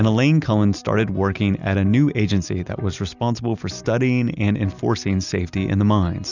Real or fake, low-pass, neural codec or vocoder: real; 7.2 kHz; none